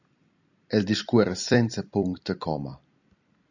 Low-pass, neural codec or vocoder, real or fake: 7.2 kHz; vocoder, 44.1 kHz, 128 mel bands every 256 samples, BigVGAN v2; fake